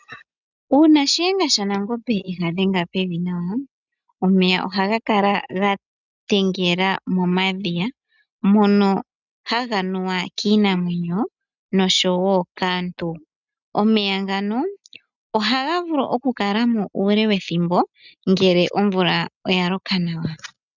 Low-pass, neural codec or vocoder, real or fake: 7.2 kHz; none; real